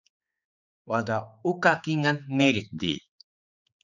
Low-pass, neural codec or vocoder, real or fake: 7.2 kHz; codec, 16 kHz, 4 kbps, X-Codec, HuBERT features, trained on general audio; fake